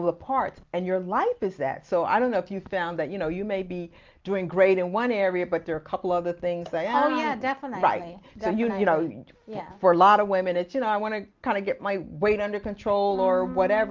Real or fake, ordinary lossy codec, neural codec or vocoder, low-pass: real; Opus, 32 kbps; none; 7.2 kHz